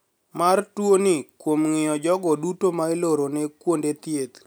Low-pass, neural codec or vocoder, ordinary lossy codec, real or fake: none; none; none; real